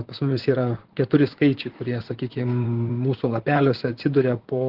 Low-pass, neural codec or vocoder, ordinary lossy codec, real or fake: 5.4 kHz; vocoder, 44.1 kHz, 128 mel bands, Pupu-Vocoder; Opus, 16 kbps; fake